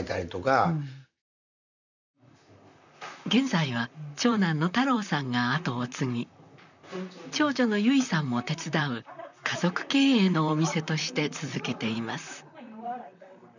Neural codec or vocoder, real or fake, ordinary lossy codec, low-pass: vocoder, 44.1 kHz, 128 mel bands, Pupu-Vocoder; fake; none; 7.2 kHz